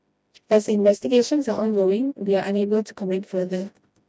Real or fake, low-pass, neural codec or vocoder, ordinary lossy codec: fake; none; codec, 16 kHz, 1 kbps, FreqCodec, smaller model; none